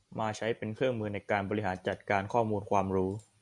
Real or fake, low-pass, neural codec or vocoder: real; 10.8 kHz; none